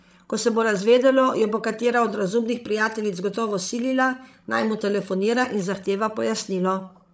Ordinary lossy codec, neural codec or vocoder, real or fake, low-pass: none; codec, 16 kHz, 16 kbps, FreqCodec, larger model; fake; none